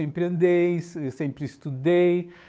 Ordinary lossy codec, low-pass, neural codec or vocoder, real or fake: none; none; codec, 16 kHz, 8 kbps, FunCodec, trained on Chinese and English, 25 frames a second; fake